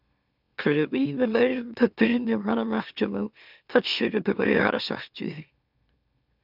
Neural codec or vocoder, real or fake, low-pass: autoencoder, 44.1 kHz, a latent of 192 numbers a frame, MeloTTS; fake; 5.4 kHz